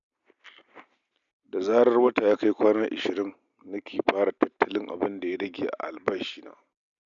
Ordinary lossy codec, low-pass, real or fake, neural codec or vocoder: none; 7.2 kHz; real; none